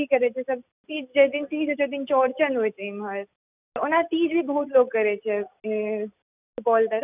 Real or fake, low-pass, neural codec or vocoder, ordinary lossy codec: real; 3.6 kHz; none; none